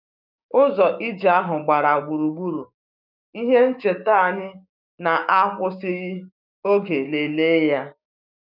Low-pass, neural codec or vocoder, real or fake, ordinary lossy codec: 5.4 kHz; codec, 16 kHz, 6 kbps, DAC; fake; none